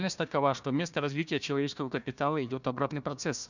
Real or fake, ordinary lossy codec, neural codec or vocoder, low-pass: fake; none; codec, 16 kHz, 1 kbps, FunCodec, trained on Chinese and English, 50 frames a second; 7.2 kHz